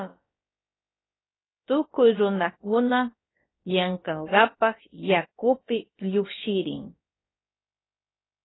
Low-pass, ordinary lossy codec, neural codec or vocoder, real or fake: 7.2 kHz; AAC, 16 kbps; codec, 16 kHz, about 1 kbps, DyCAST, with the encoder's durations; fake